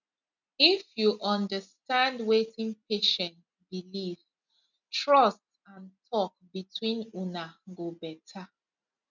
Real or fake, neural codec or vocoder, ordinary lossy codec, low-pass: real; none; none; 7.2 kHz